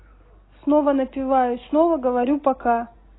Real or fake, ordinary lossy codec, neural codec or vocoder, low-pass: real; AAC, 16 kbps; none; 7.2 kHz